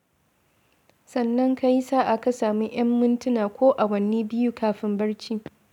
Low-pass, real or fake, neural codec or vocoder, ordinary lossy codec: 19.8 kHz; real; none; none